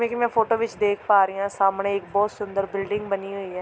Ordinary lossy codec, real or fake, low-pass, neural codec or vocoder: none; real; none; none